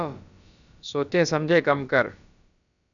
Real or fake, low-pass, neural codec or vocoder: fake; 7.2 kHz; codec, 16 kHz, about 1 kbps, DyCAST, with the encoder's durations